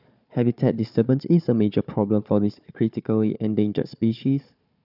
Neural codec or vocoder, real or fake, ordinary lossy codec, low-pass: codec, 16 kHz, 4 kbps, FunCodec, trained on Chinese and English, 50 frames a second; fake; none; 5.4 kHz